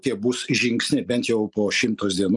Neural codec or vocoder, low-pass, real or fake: none; 10.8 kHz; real